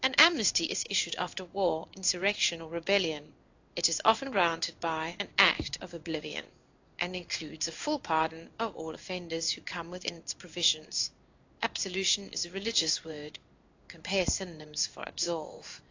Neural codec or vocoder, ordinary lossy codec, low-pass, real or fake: codec, 16 kHz in and 24 kHz out, 1 kbps, XY-Tokenizer; AAC, 48 kbps; 7.2 kHz; fake